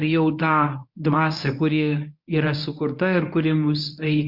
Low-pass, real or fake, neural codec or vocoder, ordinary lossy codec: 5.4 kHz; fake; codec, 24 kHz, 0.9 kbps, WavTokenizer, medium speech release version 1; MP3, 48 kbps